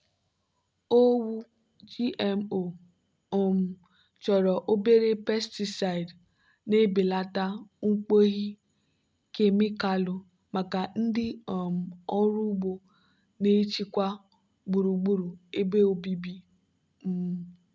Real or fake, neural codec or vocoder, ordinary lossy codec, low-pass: real; none; none; none